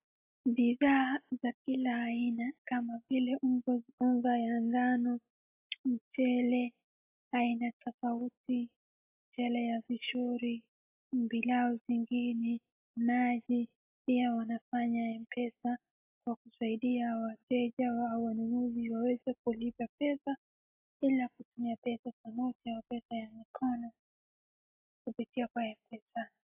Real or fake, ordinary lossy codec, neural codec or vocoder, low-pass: real; AAC, 32 kbps; none; 3.6 kHz